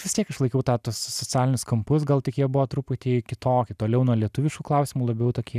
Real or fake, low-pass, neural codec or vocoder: real; 14.4 kHz; none